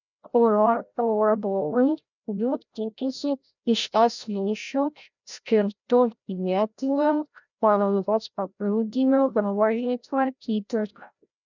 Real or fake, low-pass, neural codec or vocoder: fake; 7.2 kHz; codec, 16 kHz, 0.5 kbps, FreqCodec, larger model